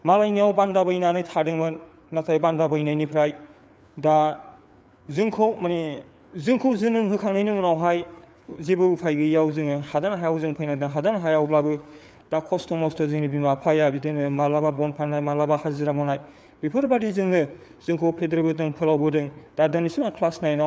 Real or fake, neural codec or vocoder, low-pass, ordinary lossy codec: fake; codec, 16 kHz, 4 kbps, FreqCodec, larger model; none; none